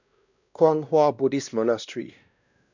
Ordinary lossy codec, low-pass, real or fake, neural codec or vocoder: none; 7.2 kHz; fake; codec, 16 kHz, 1 kbps, X-Codec, WavLM features, trained on Multilingual LibriSpeech